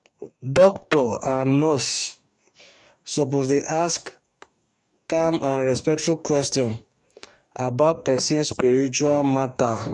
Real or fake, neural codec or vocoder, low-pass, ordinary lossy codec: fake; codec, 44.1 kHz, 2.6 kbps, DAC; 10.8 kHz; MP3, 96 kbps